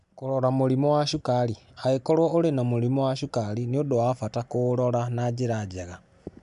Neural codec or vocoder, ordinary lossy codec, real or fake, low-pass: none; none; real; 10.8 kHz